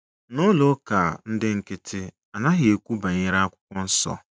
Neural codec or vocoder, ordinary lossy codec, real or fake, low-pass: none; none; real; none